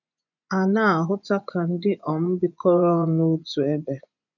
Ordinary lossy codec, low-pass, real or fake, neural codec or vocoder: none; 7.2 kHz; fake; vocoder, 24 kHz, 100 mel bands, Vocos